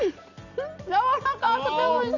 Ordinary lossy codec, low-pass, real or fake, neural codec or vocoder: none; 7.2 kHz; real; none